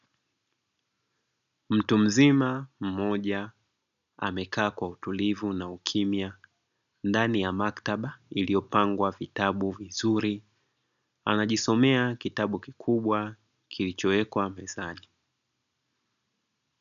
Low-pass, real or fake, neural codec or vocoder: 7.2 kHz; real; none